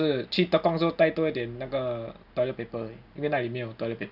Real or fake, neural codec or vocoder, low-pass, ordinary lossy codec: real; none; 5.4 kHz; none